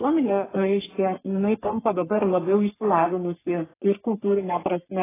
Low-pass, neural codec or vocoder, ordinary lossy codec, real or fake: 3.6 kHz; codec, 44.1 kHz, 3.4 kbps, Pupu-Codec; AAC, 16 kbps; fake